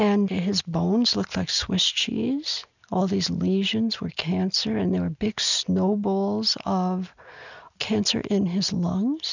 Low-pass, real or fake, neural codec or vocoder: 7.2 kHz; real; none